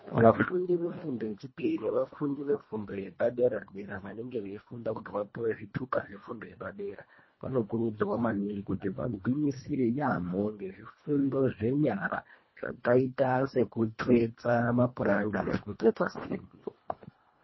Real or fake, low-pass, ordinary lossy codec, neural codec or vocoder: fake; 7.2 kHz; MP3, 24 kbps; codec, 24 kHz, 1.5 kbps, HILCodec